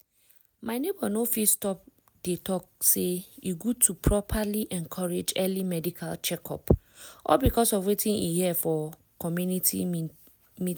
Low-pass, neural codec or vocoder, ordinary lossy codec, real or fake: none; none; none; real